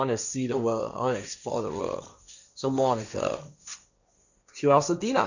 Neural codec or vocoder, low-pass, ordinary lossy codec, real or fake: codec, 16 kHz, 1.1 kbps, Voila-Tokenizer; 7.2 kHz; none; fake